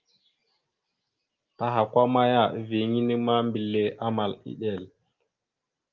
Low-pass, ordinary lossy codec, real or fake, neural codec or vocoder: 7.2 kHz; Opus, 32 kbps; real; none